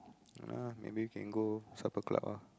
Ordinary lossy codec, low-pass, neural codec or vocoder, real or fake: none; none; none; real